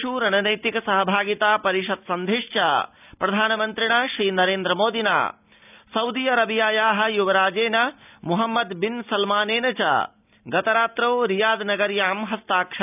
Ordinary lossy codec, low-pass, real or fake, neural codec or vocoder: none; 3.6 kHz; real; none